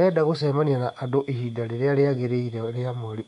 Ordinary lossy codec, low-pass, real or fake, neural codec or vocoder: none; 10.8 kHz; fake; codec, 24 kHz, 3.1 kbps, DualCodec